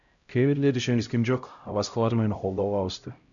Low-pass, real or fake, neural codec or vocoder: 7.2 kHz; fake; codec, 16 kHz, 0.5 kbps, X-Codec, HuBERT features, trained on LibriSpeech